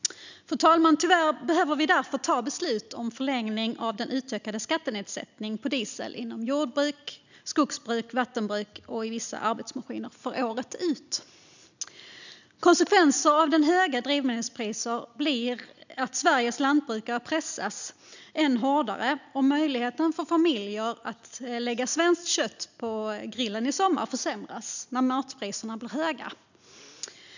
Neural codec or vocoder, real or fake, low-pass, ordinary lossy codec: none; real; 7.2 kHz; none